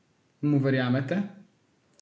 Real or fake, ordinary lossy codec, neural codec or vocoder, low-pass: real; none; none; none